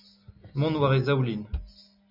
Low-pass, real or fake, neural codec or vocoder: 5.4 kHz; real; none